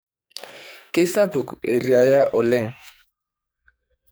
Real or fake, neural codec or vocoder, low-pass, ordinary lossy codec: fake; codec, 44.1 kHz, 2.6 kbps, SNAC; none; none